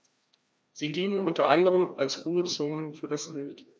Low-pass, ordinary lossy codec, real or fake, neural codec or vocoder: none; none; fake; codec, 16 kHz, 1 kbps, FreqCodec, larger model